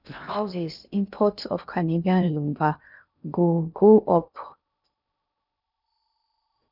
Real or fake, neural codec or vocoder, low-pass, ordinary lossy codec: fake; codec, 16 kHz in and 24 kHz out, 0.6 kbps, FocalCodec, streaming, 2048 codes; 5.4 kHz; none